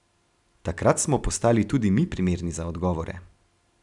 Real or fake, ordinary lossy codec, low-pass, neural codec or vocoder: real; none; 10.8 kHz; none